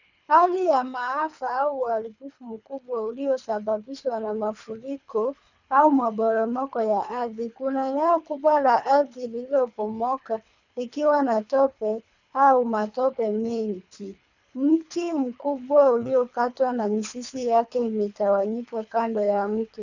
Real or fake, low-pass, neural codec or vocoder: fake; 7.2 kHz; codec, 24 kHz, 3 kbps, HILCodec